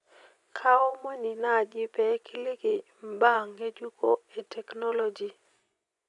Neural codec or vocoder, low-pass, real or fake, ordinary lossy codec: vocoder, 24 kHz, 100 mel bands, Vocos; 10.8 kHz; fake; none